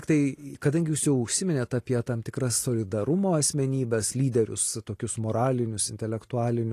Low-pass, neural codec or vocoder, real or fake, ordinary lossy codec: 14.4 kHz; none; real; AAC, 48 kbps